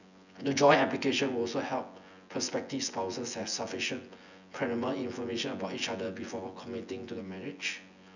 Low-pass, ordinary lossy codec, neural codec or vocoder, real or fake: 7.2 kHz; none; vocoder, 24 kHz, 100 mel bands, Vocos; fake